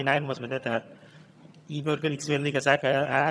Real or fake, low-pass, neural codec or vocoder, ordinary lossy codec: fake; none; vocoder, 22.05 kHz, 80 mel bands, HiFi-GAN; none